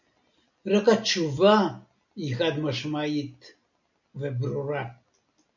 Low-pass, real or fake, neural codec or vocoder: 7.2 kHz; real; none